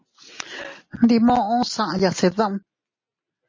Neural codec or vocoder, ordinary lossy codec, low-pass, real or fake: none; MP3, 32 kbps; 7.2 kHz; real